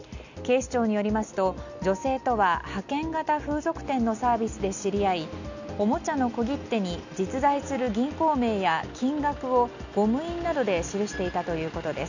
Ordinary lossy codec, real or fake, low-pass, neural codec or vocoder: none; real; 7.2 kHz; none